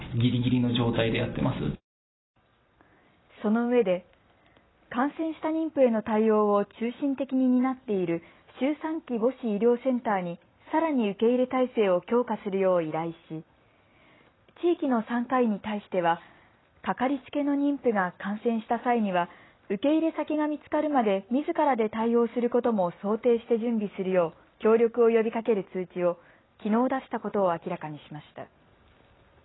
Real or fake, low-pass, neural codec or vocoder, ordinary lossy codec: real; 7.2 kHz; none; AAC, 16 kbps